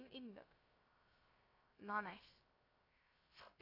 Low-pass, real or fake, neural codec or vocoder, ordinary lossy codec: 5.4 kHz; fake; codec, 16 kHz, 0.3 kbps, FocalCodec; AAC, 24 kbps